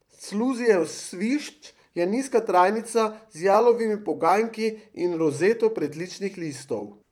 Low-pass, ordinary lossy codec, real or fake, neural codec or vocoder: 19.8 kHz; none; fake; vocoder, 44.1 kHz, 128 mel bands, Pupu-Vocoder